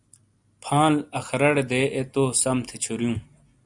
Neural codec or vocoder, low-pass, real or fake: none; 10.8 kHz; real